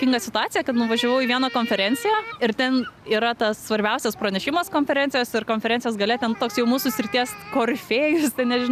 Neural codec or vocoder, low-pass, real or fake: none; 14.4 kHz; real